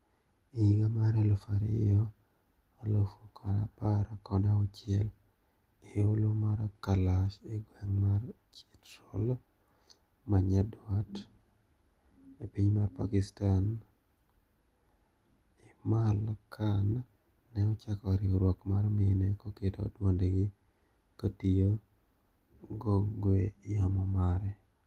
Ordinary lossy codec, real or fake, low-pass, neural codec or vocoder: Opus, 24 kbps; real; 14.4 kHz; none